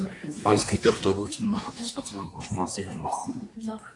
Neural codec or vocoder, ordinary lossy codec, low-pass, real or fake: codec, 24 kHz, 1 kbps, SNAC; AAC, 64 kbps; 10.8 kHz; fake